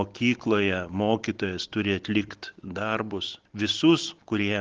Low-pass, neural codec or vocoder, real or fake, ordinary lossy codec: 7.2 kHz; none; real; Opus, 16 kbps